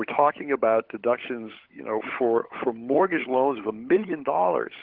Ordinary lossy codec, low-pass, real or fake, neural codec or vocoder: Opus, 16 kbps; 5.4 kHz; fake; codec, 16 kHz, 16 kbps, FunCodec, trained on LibriTTS, 50 frames a second